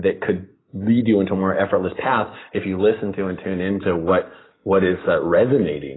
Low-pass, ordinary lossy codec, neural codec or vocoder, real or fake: 7.2 kHz; AAC, 16 kbps; none; real